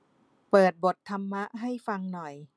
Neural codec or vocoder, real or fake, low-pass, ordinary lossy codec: none; real; none; none